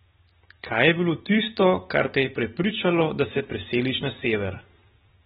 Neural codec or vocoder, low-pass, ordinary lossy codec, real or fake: none; 7.2 kHz; AAC, 16 kbps; real